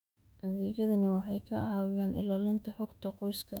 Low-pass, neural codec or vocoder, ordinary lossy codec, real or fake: 19.8 kHz; autoencoder, 48 kHz, 32 numbers a frame, DAC-VAE, trained on Japanese speech; none; fake